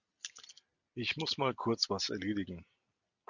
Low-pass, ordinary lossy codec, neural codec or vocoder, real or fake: 7.2 kHz; Opus, 64 kbps; none; real